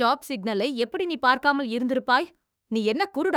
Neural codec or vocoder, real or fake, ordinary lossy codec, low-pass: autoencoder, 48 kHz, 32 numbers a frame, DAC-VAE, trained on Japanese speech; fake; none; none